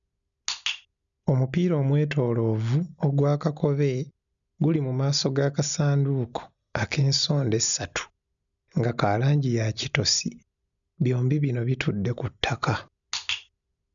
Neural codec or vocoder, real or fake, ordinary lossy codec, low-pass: none; real; none; 7.2 kHz